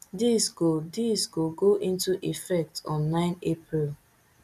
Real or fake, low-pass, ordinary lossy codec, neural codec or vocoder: real; 14.4 kHz; none; none